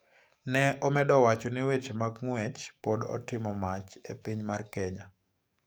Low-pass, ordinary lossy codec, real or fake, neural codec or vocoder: none; none; fake; codec, 44.1 kHz, 7.8 kbps, DAC